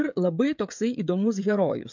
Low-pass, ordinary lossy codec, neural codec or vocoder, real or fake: 7.2 kHz; MP3, 64 kbps; codec, 16 kHz, 16 kbps, FreqCodec, smaller model; fake